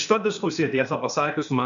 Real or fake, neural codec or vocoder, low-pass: fake; codec, 16 kHz, 0.8 kbps, ZipCodec; 7.2 kHz